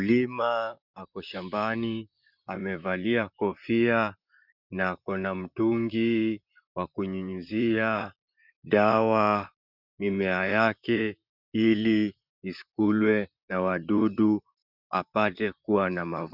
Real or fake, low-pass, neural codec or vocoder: fake; 5.4 kHz; vocoder, 44.1 kHz, 128 mel bands, Pupu-Vocoder